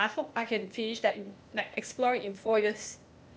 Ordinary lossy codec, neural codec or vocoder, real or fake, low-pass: none; codec, 16 kHz, 0.8 kbps, ZipCodec; fake; none